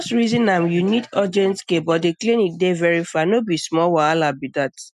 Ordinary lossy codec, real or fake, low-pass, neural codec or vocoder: none; real; 14.4 kHz; none